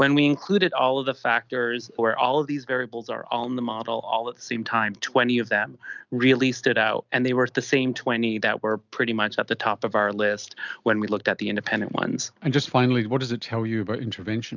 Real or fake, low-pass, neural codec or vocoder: real; 7.2 kHz; none